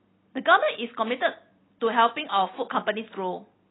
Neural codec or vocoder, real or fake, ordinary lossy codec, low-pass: none; real; AAC, 16 kbps; 7.2 kHz